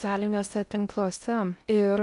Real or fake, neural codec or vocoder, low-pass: fake; codec, 16 kHz in and 24 kHz out, 0.6 kbps, FocalCodec, streaming, 4096 codes; 10.8 kHz